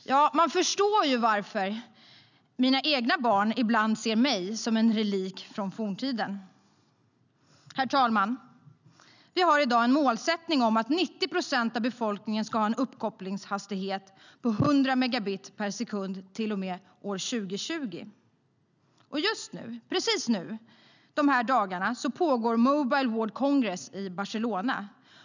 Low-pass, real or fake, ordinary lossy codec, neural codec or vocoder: 7.2 kHz; real; none; none